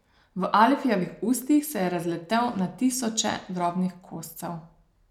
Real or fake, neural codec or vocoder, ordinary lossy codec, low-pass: real; none; none; 19.8 kHz